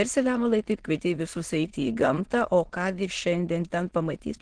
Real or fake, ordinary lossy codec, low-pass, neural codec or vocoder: fake; Opus, 16 kbps; 9.9 kHz; autoencoder, 22.05 kHz, a latent of 192 numbers a frame, VITS, trained on many speakers